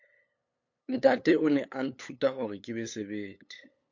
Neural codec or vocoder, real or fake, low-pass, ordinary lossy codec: codec, 16 kHz, 8 kbps, FunCodec, trained on LibriTTS, 25 frames a second; fake; 7.2 kHz; MP3, 48 kbps